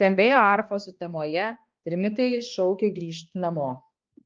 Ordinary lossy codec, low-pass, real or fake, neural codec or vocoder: Opus, 24 kbps; 7.2 kHz; fake; codec, 16 kHz, 1 kbps, X-Codec, HuBERT features, trained on balanced general audio